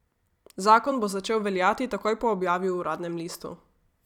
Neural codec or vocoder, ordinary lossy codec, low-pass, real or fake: vocoder, 44.1 kHz, 128 mel bands every 256 samples, BigVGAN v2; none; 19.8 kHz; fake